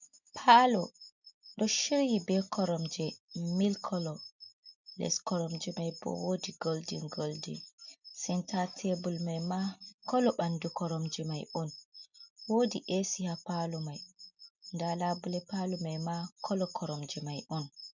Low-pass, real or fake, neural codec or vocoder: 7.2 kHz; real; none